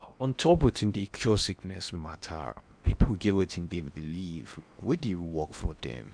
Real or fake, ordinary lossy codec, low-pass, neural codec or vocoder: fake; none; 9.9 kHz; codec, 16 kHz in and 24 kHz out, 0.8 kbps, FocalCodec, streaming, 65536 codes